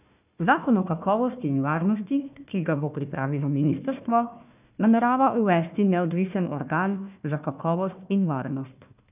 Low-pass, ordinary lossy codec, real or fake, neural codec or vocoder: 3.6 kHz; none; fake; codec, 16 kHz, 1 kbps, FunCodec, trained on Chinese and English, 50 frames a second